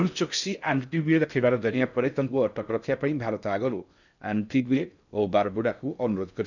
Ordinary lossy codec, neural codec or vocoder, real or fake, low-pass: none; codec, 16 kHz in and 24 kHz out, 0.6 kbps, FocalCodec, streaming, 4096 codes; fake; 7.2 kHz